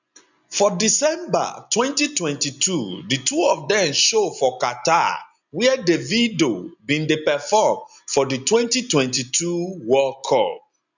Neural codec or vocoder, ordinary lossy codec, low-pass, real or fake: none; none; 7.2 kHz; real